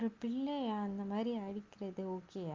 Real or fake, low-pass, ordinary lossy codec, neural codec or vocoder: real; 7.2 kHz; Opus, 32 kbps; none